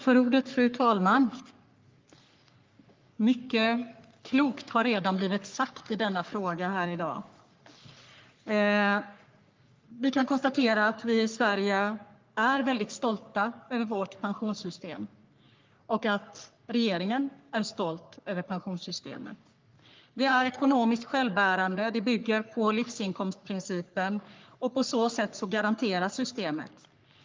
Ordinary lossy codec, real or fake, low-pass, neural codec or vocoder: Opus, 24 kbps; fake; 7.2 kHz; codec, 44.1 kHz, 3.4 kbps, Pupu-Codec